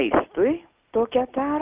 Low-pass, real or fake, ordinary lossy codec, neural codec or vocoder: 3.6 kHz; real; Opus, 24 kbps; none